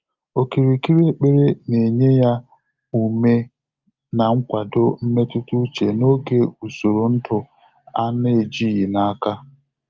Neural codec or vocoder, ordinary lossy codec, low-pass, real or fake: none; Opus, 24 kbps; 7.2 kHz; real